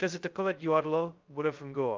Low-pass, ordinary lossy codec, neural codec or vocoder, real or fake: 7.2 kHz; Opus, 24 kbps; codec, 16 kHz, 0.2 kbps, FocalCodec; fake